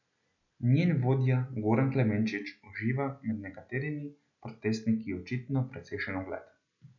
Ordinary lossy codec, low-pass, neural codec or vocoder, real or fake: none; 7.2 kHz; none; real